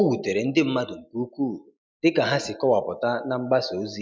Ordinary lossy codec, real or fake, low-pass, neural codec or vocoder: none; real; none; none